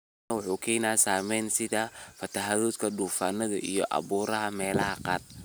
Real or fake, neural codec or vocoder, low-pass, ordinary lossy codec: real; none; none; none